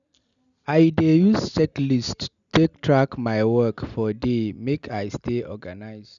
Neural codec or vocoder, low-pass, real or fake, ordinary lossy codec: none; 7.2 kHz; real; none